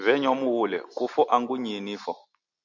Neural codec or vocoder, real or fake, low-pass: none; real; 7.2 kHz